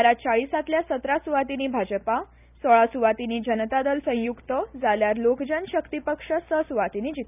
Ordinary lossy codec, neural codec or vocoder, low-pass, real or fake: none; none; 3.6 kHz; real